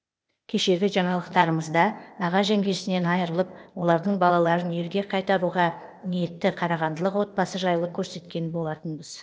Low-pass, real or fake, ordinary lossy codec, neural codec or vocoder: none; fake; none; codec, 16 kHz, 0.8 kbps, ZipCodec